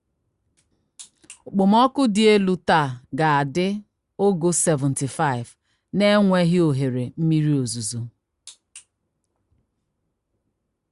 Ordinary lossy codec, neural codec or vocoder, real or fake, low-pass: Opus, 64 kbps; none; real; 10.8 kHz